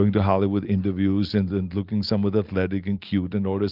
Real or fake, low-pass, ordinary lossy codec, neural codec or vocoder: real; 5.4 kHz; Opus, 32 kbps; none